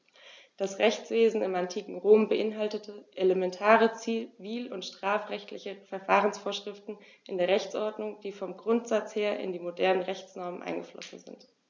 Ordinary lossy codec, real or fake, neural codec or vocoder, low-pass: none; real; none; none